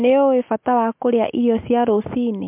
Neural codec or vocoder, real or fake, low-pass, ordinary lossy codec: none; real; 3.6 kHz; MP3, 32 kbps